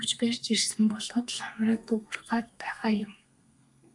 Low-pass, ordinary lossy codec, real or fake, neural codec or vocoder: 10.8 kHz; AAC, 64 kbps; fake; codec, 32 kHz, 1.9 kbps, SNAC